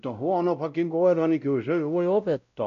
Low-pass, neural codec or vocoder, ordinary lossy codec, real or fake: 7.2 kHz; codec, 16 kHz, 0.5 kbps, X-Codec, WavLM features, trained on Multilingual LibriSpeech; AAC, 96 kbps; fake